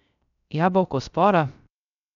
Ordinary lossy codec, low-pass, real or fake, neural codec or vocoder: none; 7.2 kHz; fake; codec, 16 kHz, 0.3 kbps, FocalCodec